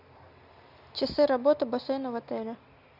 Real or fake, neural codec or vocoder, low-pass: real; none; 5.4 kHz